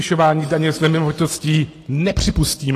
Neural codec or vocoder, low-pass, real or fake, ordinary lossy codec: none; 14.4 kHz; real; AAC, 48 kbps